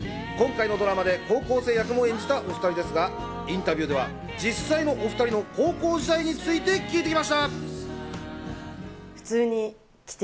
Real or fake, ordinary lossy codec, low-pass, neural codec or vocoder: real; none; none; none